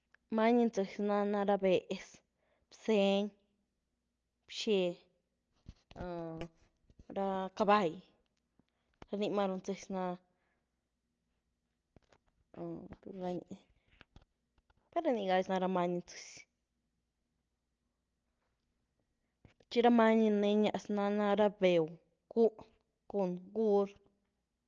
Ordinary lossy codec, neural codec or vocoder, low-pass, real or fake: Opus, 24 kbps; none; 7.2 kHz; real